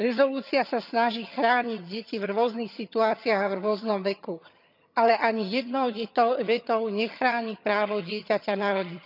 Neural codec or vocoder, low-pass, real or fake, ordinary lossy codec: vocoder, 22.05 kHz, 80 mel bands, HiFi-GAN; 5.4 kHz; fake; none